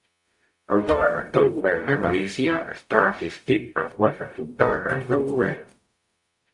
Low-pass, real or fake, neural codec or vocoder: 10.8 kHz; fake; codec, 44.1 kHz, 0.9 kbps, DAC